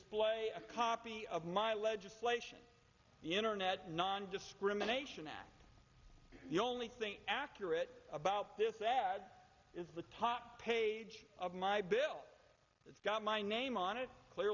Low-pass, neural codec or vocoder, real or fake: 7.2 kHz; none; real